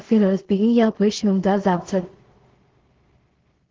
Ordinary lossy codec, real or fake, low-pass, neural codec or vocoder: Opus, 16 kbps; fake; 7.2 kHz; codec, 16 kHz in and 24 kHz out, 0.4 kbps, LongCat-Audio-Codec, two codebook decoder